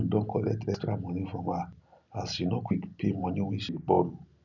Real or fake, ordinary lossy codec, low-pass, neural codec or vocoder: real; none; 7.2 kHz; none